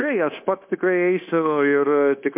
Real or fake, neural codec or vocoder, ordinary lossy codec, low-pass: fake; codec, 16 kHz, 0.9 kbps, LongCat-Audio-Codec; MP3, 32 kbps; 3.6 kHz